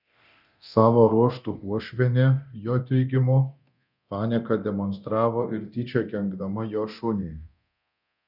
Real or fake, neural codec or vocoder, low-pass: fake; codec, 24 kHz, 0.9 kbps, DualCodec; 5.4 kHz